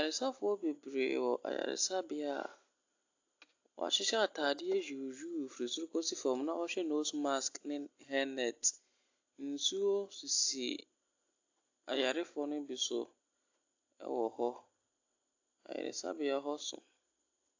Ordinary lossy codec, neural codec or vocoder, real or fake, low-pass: AAC, 48 kbps; none; real; 7.2 kHz